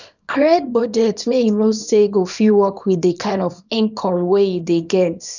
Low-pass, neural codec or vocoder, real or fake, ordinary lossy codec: 7.2 kHz; codec, 24 kHz, 0.9 kbps, WavTokenizer, small release; fake; none